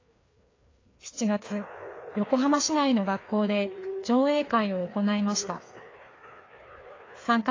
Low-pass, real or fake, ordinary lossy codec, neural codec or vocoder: 7.2 kHz; fake; AAC, 32 kbps; codec, 16 kHz, 2 kbps, FreqCodec, larger model